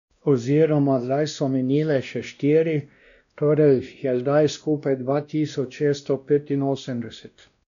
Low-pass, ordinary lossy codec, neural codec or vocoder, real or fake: 7.2 kHz; none; codec, 16 kHz, 1 kbps, X-Codec, WavLM features, trained on Multilingual LibriSpeech; fake